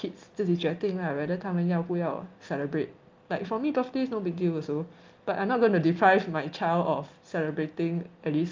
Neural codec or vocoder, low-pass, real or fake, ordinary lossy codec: none; 7.2 kHz; real; Opus, 32 kbps